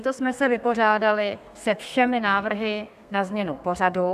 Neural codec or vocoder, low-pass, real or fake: codec, 32 kHz, 1.9 kbps, SNAC; 14.4 kHz; fake